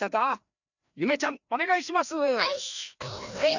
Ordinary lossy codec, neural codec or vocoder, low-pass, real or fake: none; codec, 16 kHz, 1 kbps, FreqCodec, larger model; 7.2 kHz; fake